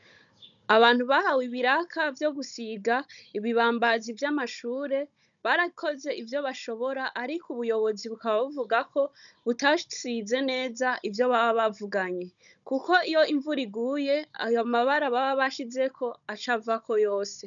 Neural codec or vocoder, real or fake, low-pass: codec, 16 kHz, 16 kbps, FunCodec, trained on Chinese and English, 50 frames a second; fake; 7.2 kHz